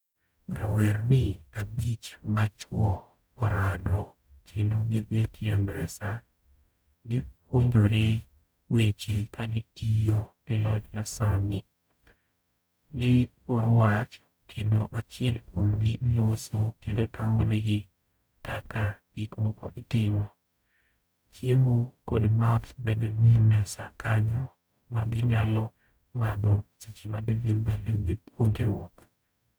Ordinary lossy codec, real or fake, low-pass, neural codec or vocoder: none; fake; none; codec, 44.1 kHz, 0.9 kbps, DAC